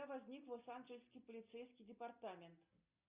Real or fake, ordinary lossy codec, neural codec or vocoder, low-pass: real; Opus, 64 kbps; none; 3.6 kHz